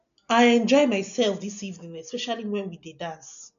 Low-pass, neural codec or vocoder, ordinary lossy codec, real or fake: 7.2 kHz; none; none; real